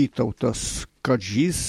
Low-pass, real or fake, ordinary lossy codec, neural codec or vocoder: 19.8 kHz; real; MP3, 64 kbps; none